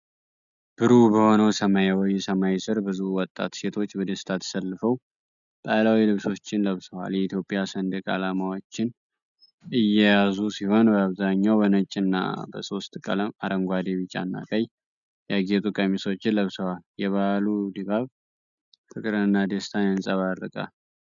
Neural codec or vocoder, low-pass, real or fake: none; 7.2 kHz; real